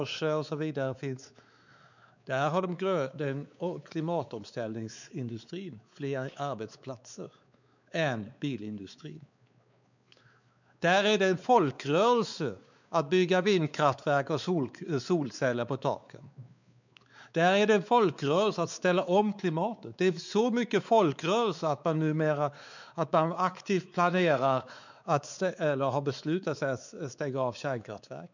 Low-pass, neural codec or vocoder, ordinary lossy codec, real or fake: 7.2 kHz; codec, 16 kHz, 4 kbps, X-Codec, WavLM features, trained on Multilingual LibriSpeech; none; fake